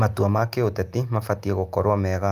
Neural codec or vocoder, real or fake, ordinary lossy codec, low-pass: none; real; Opus, 32 kbps; 14.4 kHz